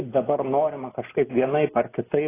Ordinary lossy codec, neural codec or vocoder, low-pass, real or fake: AAC, 16 kbps; none; 3.6 kHz; real